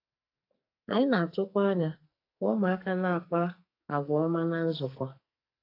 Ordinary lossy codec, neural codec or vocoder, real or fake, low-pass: AAC, 32 kbps; codec, 44.1 kHz, 2.6 kbps, SNAC; fake; 5.4 kHz